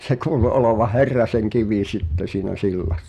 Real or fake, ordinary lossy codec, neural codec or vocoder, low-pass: real; none; none; 14.4 kHz